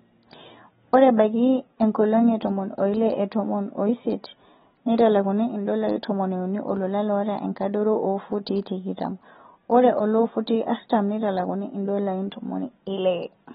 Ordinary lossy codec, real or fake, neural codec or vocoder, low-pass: AAC, 16 kbps; real; none; 19.8 kHz